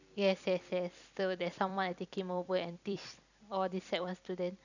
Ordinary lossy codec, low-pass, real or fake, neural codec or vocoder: none; 7.2 kHz; fake; vocoder, 44.1 kHz, 128 mel bands every 256 samples, BigVGAN v2